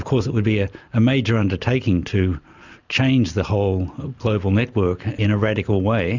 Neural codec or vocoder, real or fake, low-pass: none; real; 7.2 kHz